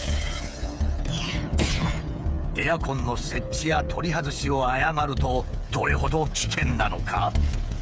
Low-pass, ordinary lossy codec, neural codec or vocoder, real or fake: none; none; codec, 16 kHz, 8 kbps, FreqCodec, smaller model; fake